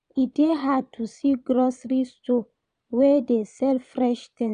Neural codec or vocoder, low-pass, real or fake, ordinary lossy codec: vocoder, 22.05 kHz, 80 mel bands, Vocos; 9.9 kHz; fake; none